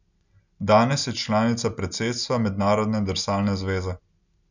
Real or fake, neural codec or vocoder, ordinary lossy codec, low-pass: real; none; none; 7.2 kHz